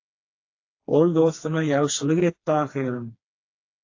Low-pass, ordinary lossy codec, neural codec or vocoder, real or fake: 7.2 kHz; AAC, 48 kbps; codec, 16 kHz, 2 kbps, FreqCodec, smaller model; fake